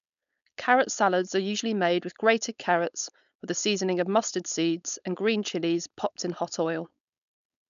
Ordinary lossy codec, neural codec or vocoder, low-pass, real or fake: none; codec, 16 kHz, 4.8 kbps, FACodec; 7.2 kHz; fake